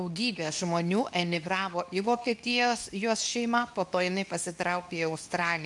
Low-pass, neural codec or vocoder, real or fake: 10.8 kHz; codec, 24 kHz, 0.9 kbps, WavTokenizer, medium speech release version 2; fake